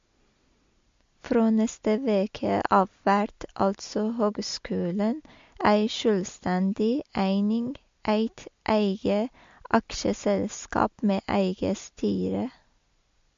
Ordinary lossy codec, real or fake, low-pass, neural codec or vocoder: MP3, 48 kbps; real; 7.2 kHz; none